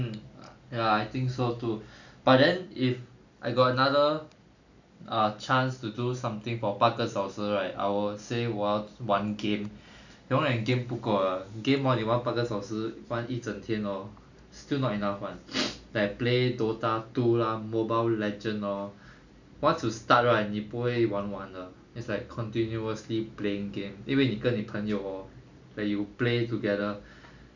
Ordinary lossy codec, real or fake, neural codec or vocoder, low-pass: none; real; none; 7.2 kHz